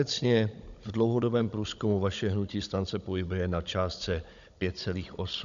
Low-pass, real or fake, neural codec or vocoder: 7.2 kHz; fake; codec, 16 kHz, 16 kbps, FunCodec, trained on Chinese and English, 50 frames a second